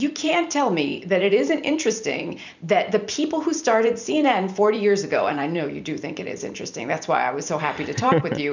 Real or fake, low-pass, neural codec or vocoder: fake; 7.2 kHz; vocoder, 44.1 kHz, 128 mel bands every 256 samples, BigVGAN v2